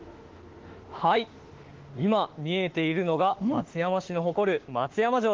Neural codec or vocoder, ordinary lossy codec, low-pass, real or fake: autoencoder, 48 kHz, 32 numbers a frame, DAC-VAE, trained on Japanese speech; Opus, 16 kbps; 7.2 kHz; fake